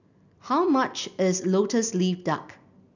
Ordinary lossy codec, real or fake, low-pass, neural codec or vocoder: none; real; 7.2 kHz; none